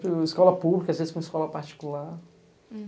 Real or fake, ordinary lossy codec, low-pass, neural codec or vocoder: real; none; none; none